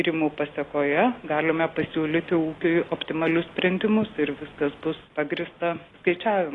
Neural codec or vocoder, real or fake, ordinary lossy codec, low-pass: none; real; AAC, 32 kbps; 10.8 kHz